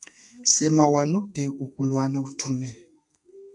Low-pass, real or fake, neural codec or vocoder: 10.8 kHz; fake; codec, 32 kHz, 1.9 kbps, SNAC